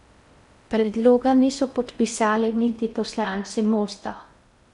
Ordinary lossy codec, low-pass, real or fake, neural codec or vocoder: MP3, 96 kbps; 10.8 kHz; fake; codec, 16 kHz in and 24 kHz out, 0.6 kbps, FocalCodec, streaming, 4096 codes